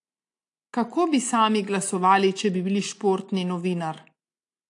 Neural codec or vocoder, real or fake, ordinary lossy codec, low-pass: none; real; AAC, 48 kbps; 10.8 kHz